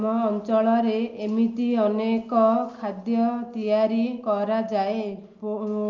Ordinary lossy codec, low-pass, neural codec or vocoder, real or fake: Opus, 24 kbps; 7.2 kHz; none; real